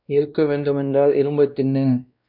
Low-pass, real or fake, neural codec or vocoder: 5.4 kHz; fake; codec, 16 kHz, 1 kbps, X-Codec, WavLM features, trained on Multilingual LibriSpeech